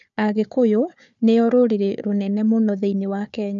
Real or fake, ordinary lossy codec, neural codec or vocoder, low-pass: fake; none; codec, 16 kHz, 4 kbps, FunCodec, trained on Chinese and English, 50 frames a second; 7.2 kHz